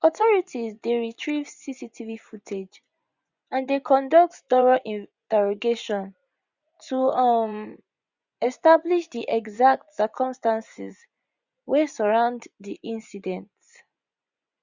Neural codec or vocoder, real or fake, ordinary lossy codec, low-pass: vocoder, 24 kHz, 100 mel bands, Vocos; fake; Opus, 64 kbps; 7.2 kHz